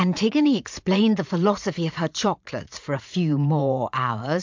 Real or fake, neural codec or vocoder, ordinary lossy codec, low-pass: fake; vocoder, 44.1 kHz, 80 mel bands, Vocos; MP3, 64 kbps; 7.2 kHz